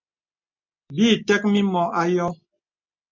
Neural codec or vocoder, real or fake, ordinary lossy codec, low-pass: none; real; MP3, 64 kbps; 7.2 kHz